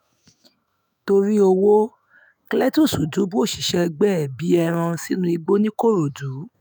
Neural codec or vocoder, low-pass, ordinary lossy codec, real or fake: autoencoder, 48 kHz, 128 numbers a frame, DAC-VAE, trained on Japanese speech; none; none; fake